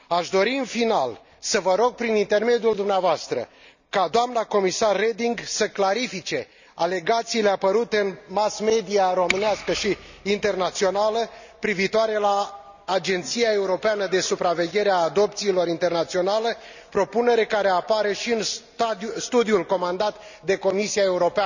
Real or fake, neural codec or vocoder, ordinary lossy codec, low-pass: real; none; none; 7.2 kHz